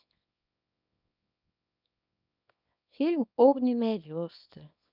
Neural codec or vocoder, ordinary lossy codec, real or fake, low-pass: codec, 24 kHz, 0.9 kbps, WavTokenizer, small release; none; fake; 5.4 kHz